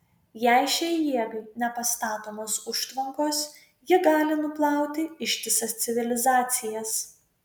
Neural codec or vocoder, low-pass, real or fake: none; 19.8 kHz; real